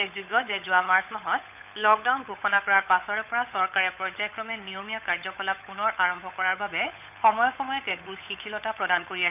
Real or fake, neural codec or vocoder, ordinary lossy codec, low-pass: fake; codec, 16 kHz, 8 kbps, FunCodec, trained on Chinese and English, 25 frames a second; none; 3.6 kHz